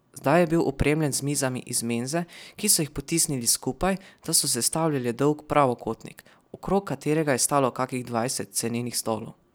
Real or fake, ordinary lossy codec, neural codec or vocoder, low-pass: real; none; none; none